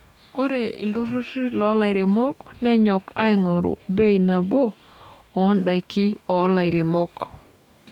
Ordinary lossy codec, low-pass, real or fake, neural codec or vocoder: none; 19.8 kHz; fake; codec, 44.1 kHz, 2.6 kbps, DAC